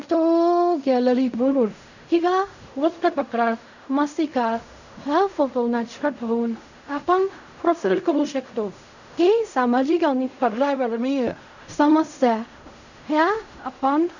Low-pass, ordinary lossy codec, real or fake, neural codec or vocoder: 7.2 kHz; none; fake; codec, 16 kHz in and 24 kHz out, 0.4 kbps, LongCat-Audio-Codec, fine tuned four codebook decoder